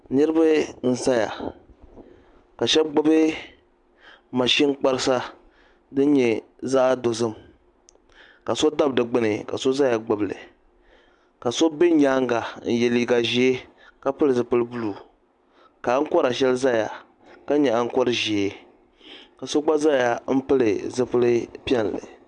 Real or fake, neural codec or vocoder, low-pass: real; none; 10.8 kHz